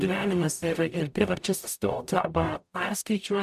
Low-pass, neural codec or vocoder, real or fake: 14.4 kHz; codec, 44.1 kHz, 0.9 kbps, DAC; fake